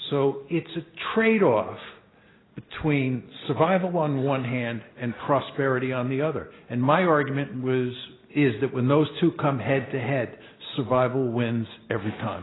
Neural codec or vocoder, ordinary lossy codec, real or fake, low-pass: none; AAC, 16 kbps; real; 7.2 kHz